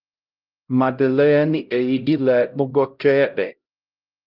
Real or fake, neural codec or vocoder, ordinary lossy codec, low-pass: fake; codec, 16 kHz, 0.5 kbps, X-Codec, HuBERT features, trained on LibriSpeech; Opus, 24 kbps; 5.4 kHz